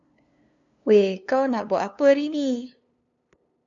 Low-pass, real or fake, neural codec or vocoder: 7.2 kHz; fake; codec, 16 kHz, 2 kbps, FunCodec, trained on LibriTTS, 25 frames a second